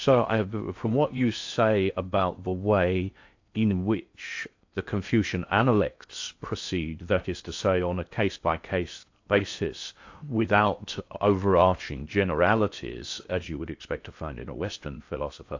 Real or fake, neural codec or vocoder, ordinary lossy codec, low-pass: fake; codec, 16 kHz in and 24 kHz out, 0.6 kbps, FocalCodec, streaming, 2048 codes; AAC, 48 kbps; 7.2 kHz